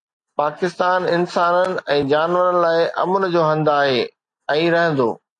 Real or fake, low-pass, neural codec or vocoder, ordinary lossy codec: real; 10.8 kHz; none; AAC, 48 kbps